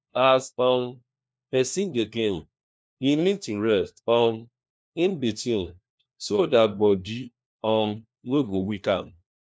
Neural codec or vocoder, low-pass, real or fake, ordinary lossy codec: codec, 16 kHz, 1 kbps, FunCodec, trained on LibriTTS, 50 frames a second; none; fake; none